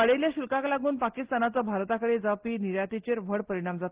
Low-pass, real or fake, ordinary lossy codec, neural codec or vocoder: 3.6 kHz; real; Opus, 16 kbps; none